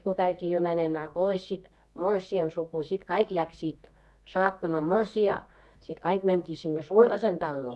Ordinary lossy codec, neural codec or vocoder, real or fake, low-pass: none; codec, 24 kHz, 0.9 kbps, WavTokenizer, medium music audio release; fake; none